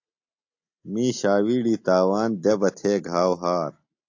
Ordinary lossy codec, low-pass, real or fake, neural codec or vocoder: AAC, 48 kbps; 7.2 kHz; real; none